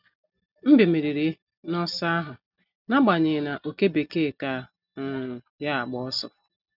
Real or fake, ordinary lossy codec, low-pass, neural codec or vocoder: real; none; 5.4 kHz; none